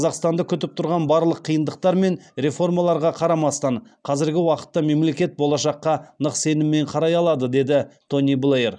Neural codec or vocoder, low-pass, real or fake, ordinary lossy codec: none; 9.9 kHz; real; none